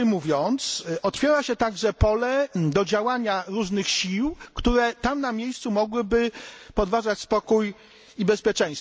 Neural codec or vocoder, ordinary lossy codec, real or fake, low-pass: none; none; real; none